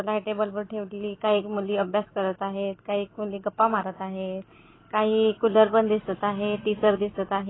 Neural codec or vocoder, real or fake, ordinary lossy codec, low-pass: none; real; AAC, 16 kbps; 7.2 kHz